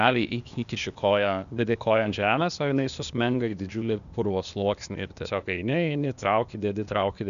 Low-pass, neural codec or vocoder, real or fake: 7.2 kHz; codec, 16 kHz, 0.8 kbps, ZipCodec; fake